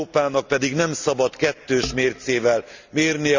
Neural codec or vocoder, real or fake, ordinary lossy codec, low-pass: none; real; Opus, 64 kbps; 7.2 kHz